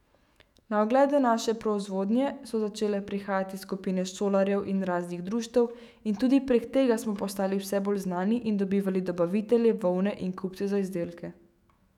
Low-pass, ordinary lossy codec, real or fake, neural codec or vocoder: 19.8 kHz; none; fake; autoencoder, 48 kHz, 128 numbers a frame, DAC-VAE, trained on Japanese speech